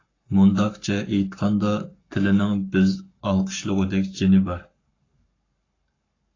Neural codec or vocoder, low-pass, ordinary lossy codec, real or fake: codec, 44.1 kHz, 7.8 kbps, Pupu-Codec; 7.2 kHz; AAC, 32 kbps; fake